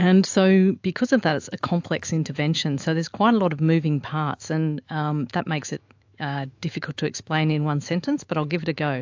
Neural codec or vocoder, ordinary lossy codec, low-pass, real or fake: autoencoder, 48 kHz, 128 numbers a frame, DAC-VAE, trained on Japanese speech; AAC, 48 kbps; 7.2 kHz; fake